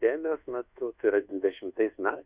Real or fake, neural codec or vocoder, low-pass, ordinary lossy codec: fake; codec, 24 kHz, 1.2 kbps, DualCodec; 3.6 kHz; Opus, 64 kbps